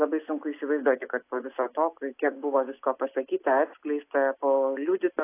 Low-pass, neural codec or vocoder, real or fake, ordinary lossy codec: 3.6 kHz; none; real; AAC, 24 kbps